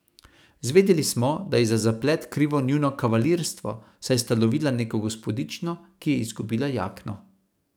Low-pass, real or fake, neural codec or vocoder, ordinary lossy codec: none; fake; codec, 44.1 kHz, 7.8 kbps, DAC; none